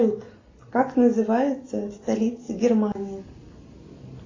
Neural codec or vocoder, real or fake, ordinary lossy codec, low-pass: none; real; AAC, 32 kbps; 7.2 kHz